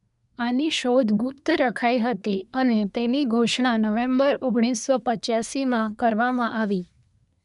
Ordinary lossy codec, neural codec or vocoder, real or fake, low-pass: none; codec, 24 kHz, 1 kbps, SNAC; fake; 10.8 kHz